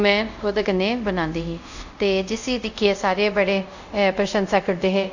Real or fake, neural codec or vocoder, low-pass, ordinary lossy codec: fake; codec, 24 kHz, 0.5 kbps, DualCodec; 7.2 kHz; none